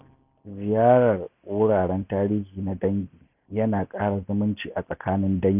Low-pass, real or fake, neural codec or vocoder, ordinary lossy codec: 3.6 kHz; real; none; none